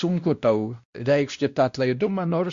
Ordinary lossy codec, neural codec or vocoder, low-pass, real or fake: Opus, 64 kbps; codec, 16 kHz, 1 kbps, X-Codec, WavLM features, trained on Multilingual LibriSpeech; 7.2 kHz; fake